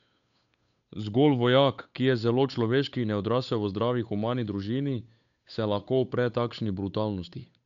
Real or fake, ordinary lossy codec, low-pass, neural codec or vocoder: fake; none; 7.2 kHz; codec, 16 kHz, 8 kbps, FunCodec, trained on Chinese and English, 25 frames a second